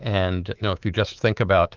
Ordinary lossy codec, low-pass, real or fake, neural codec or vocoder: Opus, 24 kbps; 7.2 kHz; fake; codec, 24 kHz, 3.1 kbps, DualCodec